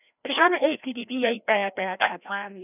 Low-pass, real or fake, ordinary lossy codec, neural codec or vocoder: 3.6 kHz; fake; none; codec, 16 kHz, 1 kbps, FreqCodec, larger model